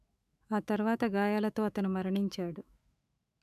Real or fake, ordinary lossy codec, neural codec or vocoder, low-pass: fake; none; autoencoder, 48 kHz, 128 numbers a frame, DAC-VAE, trained on Japanese speech; 14.4 kHz